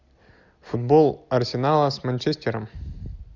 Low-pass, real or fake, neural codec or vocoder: 7.2 kHz; real; none